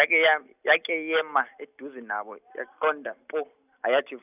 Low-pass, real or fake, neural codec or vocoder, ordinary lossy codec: 3.6 kHz; real; none; none